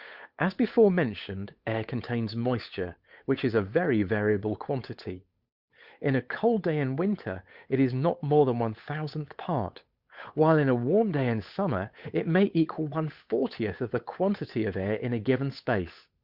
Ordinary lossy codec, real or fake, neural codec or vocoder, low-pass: Opus, 64 kbps; fake; codec, 16 kHz, 8 kbps, FunCodec, trained on Chinese and English, 25 frames a second; 5.4 kHz